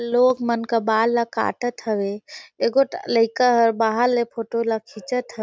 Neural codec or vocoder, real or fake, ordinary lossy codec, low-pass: none; real; none; none